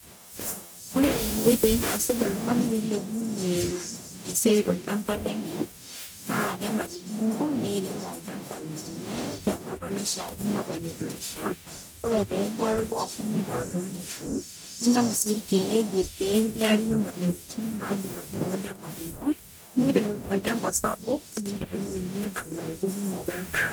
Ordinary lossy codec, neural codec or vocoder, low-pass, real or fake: none; codec, 44.1 kHz, 0.9 kbps, DAC; none; fake